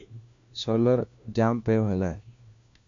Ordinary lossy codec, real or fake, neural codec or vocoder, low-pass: MP3, 64 kbps; fake; codec, 16 kHz, 1 kbps, FunCodec, trained on LibriTTS, 50 frames a second; 7.2 kHz